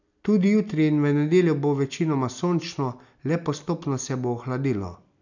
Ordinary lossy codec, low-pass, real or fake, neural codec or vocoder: none; 7.2 kHz; real; none